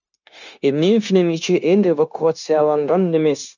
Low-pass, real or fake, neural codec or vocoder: 7.2 kHz; fake; codec, 16 kHz, 0.9 kbps, LongCat-Audio-Codec